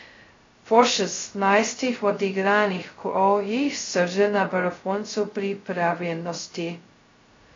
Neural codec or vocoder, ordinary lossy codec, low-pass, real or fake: codec, 16 kHz, 0.2 kbps, FocalCodec; AAC, 32 kbps; 7.2 kHz; fake